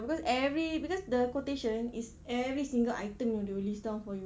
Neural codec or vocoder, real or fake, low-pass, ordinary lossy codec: none; real; none; none